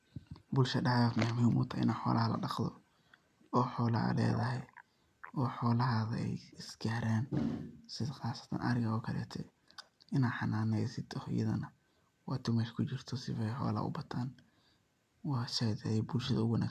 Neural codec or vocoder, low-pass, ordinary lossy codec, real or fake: none; 14.4 kHz; none; real